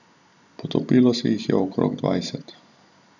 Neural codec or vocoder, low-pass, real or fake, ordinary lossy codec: none; 7.2 kHz; real; none